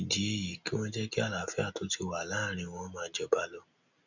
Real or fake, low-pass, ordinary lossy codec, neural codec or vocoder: real; 7.2 kHz; none; none